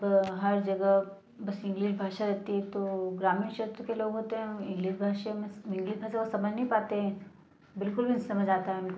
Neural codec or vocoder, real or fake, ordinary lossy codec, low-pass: none; real; none; none